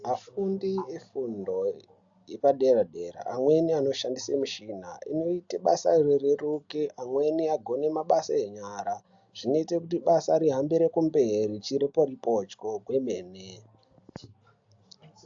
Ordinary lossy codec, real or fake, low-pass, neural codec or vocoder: AAC, 64 kbps; real; 7.2 kHz; none